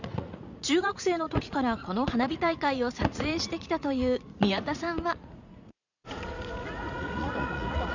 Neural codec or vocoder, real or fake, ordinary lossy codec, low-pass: vocoder, 44.1 kHz, 80 mel bands, Vocos; fake; none; 7.2 kHz